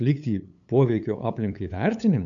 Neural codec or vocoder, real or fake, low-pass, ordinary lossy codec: codec, 16 kHz, 8 kbps, FunCodec, trained on LibriTTS, 25 frames a second; fake; 7.2 kHz; MP3, 64 kbps